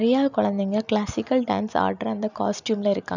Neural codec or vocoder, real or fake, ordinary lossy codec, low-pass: none; real; none; 7.2 kHz